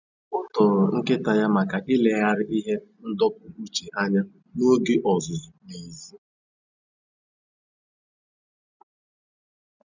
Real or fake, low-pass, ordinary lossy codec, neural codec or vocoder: real; 7.2 kHz; none; none